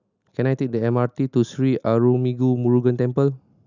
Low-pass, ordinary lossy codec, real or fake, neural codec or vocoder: 7.2 kHz; none; real; none